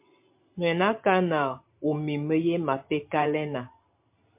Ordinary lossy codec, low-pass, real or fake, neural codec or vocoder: MP3, 32 kbps; 3.6 kHz; fake; vocoder, 44.1 kHz, 128 mel bands every 512 samples, BigVGAN v2